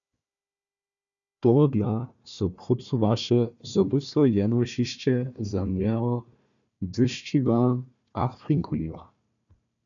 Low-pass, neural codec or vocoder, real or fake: 7.2 kHz; codec, 16 kHz, 1 kbps, FunCodec, trained on Chinese and English, 50 frames a second; fake